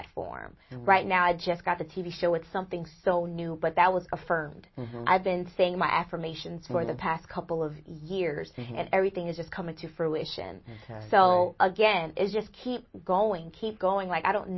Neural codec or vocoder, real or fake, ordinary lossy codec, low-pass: none; real; MP3, 24 kbps; 7.2 kHz